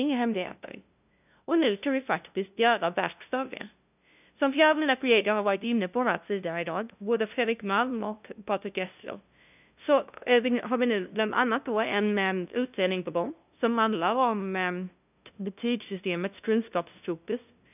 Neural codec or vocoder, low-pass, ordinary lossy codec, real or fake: codec, 16 kHz, 0.5 kbps, FunCodec, trained on LibriTTS, 25 frames a second; 3.6 kHz; none; fake